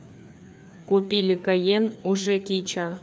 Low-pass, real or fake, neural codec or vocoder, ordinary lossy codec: none; fake; codec, 16 kHz, 2 kbps, FreqCodec, larger model; none